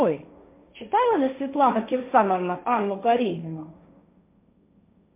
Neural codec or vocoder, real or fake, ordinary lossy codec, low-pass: codec, 16 kHz, 1.1 kbps, Voila-Tokenizer; fake; MP3, 32 kbps; 3.6 kHz